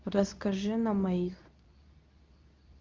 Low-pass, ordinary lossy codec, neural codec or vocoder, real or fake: 7.2 kHz; Opus, 32 kbps; codec, 24 kHz, 0.9 kbps, WavTokenizer, medium speech release version 2; fake